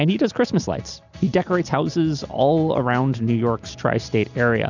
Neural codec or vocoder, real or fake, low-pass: none; real; 7.2 kHz